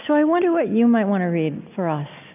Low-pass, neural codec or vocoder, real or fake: 3.6 kHz; none; real